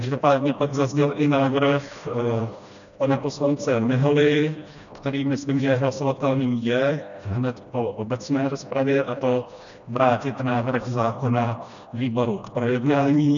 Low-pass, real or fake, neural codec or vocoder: 7.2 kHz; fake; codec, 16 kHz, 1 kbps, FreqCodec, smaller model